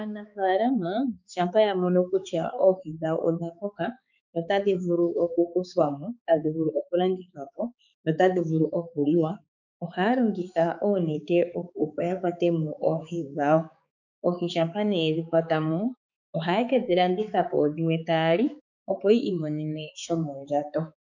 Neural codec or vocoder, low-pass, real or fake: codec, 16 kHz, 4 kbps, X-Codec, HuBERT features, trained on balanced general audio; 7.2 kHz; fake